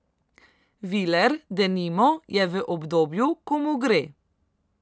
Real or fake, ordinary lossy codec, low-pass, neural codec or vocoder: real; none; none; none